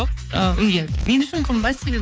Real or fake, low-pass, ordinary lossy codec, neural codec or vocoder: fake; none; none; codec, 16 kHz, 4 kbps, X-Codec, HuBERT features, trained on balanced general audio